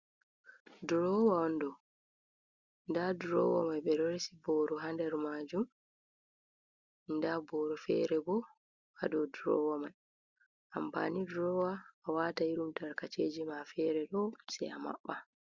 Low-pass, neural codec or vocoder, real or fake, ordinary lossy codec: 7.2 kHz; none; real; Opus, 64 kbps